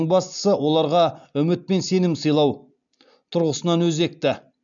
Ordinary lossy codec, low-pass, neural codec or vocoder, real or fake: none; 7.2 kHz; none; real